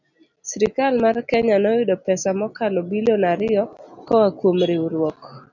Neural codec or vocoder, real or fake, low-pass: none; real; 7.2 kHz